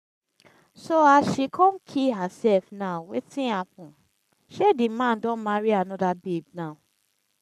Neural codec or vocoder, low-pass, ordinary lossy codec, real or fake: codec, 44.1 kHz, 7.8 kbps, Pupu-Codec; 14.4 kHz; none; fake